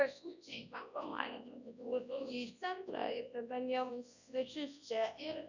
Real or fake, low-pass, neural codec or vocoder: fake; 7.2 kHz; codec, 24 kHz, 0.9 kbps, WavTokenizer, large speech release